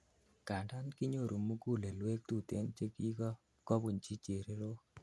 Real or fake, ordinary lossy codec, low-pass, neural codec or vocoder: real; none; none; none